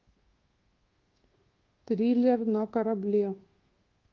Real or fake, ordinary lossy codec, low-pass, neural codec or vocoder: fake; Opus, 16 kbps; 7.2 kHz; codec, 24 kHz, 1.2 kbps, DualCodec